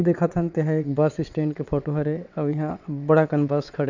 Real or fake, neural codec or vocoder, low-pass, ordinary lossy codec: fake; vocoder, 22.05 kHz, 80 mel bands, WaveNeXt; 7.2 kHz; none